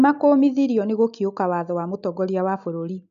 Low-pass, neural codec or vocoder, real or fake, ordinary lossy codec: 7.2 kHz; none; real; AAC, 96 kbps